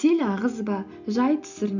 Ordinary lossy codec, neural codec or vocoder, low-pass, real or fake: none; none; 7.2 kHz; real